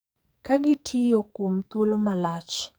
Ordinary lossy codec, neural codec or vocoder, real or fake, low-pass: none; codec, 44.1 kHz, 2.6 kbps, SNAC; fake; none